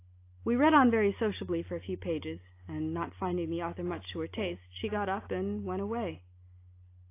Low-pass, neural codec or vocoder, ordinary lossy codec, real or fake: 3.6 kHz; none; AAC, 24 kbps; real